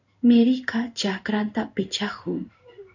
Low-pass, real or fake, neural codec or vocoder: 7.2 kHz; fake; codec, 16 kHz in and 24 kHz out, 1 kbps, XY-Tokenizer